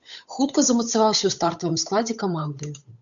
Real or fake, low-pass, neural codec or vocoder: fake; 7.2 kHz; codec, 16 kHz, 8 kbps, FunCodec, trained on Chinese and English, 25 frames a second